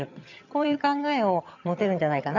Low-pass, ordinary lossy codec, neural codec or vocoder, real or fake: 7.2 kHz; none; vocoder, 22.05 kHz, 80 mel bands, HiFi-GAN; fake